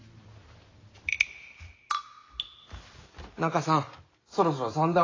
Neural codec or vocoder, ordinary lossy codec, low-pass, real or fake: none; AAC, 32 kbps; 7.2 kHz; real